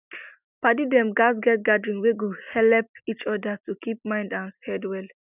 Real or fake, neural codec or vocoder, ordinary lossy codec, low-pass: real; none; none; 3.6 kHz